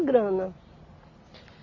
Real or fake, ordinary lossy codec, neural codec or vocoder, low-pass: real; AAC, 48 kbps; none; 7.2 kHz